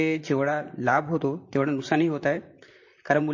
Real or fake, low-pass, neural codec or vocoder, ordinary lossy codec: fake; 7.2 kHz; vocoder, 44.1 kHz, 128 mel bands, Pupu-Vocoder; MP3, 32 kbps